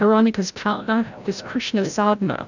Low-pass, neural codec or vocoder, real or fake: 7.2 kHz; codec, 16 kHz, 0.5 kbps, FreqCodec, larger model; fake